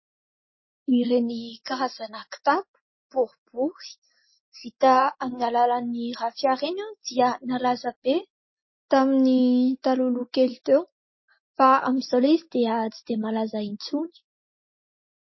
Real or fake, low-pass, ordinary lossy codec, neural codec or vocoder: real; 7.2 kHz; MP3, 24 kbps; none